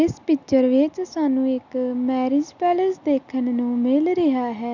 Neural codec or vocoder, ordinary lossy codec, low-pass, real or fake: none; none; 7.2 kHz; real